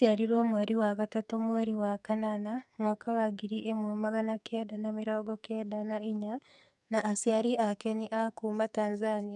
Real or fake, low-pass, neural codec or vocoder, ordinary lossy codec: fake; 10.8 kHz; codec, 44.1 kHz, 2.6 kbps, SNAC; none